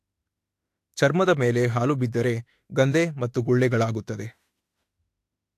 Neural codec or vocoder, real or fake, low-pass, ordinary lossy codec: autoencoder, 48 kHz, 32 numbers a frame, DAC-VAE, trained on Japanese speech; fake; 14.4 kHz; AAC, 64 kbps